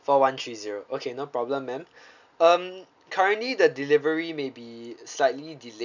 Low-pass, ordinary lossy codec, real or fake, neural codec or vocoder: 7.2 kHz; none; real; none